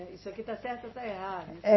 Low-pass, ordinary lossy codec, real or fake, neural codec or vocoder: 7.2 kHz; MP3, 24 kbps; real; none